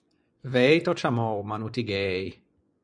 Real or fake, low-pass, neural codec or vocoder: fake; 9.9 kHz; vocoder, 24 kHz, 100 mel bands, Vocos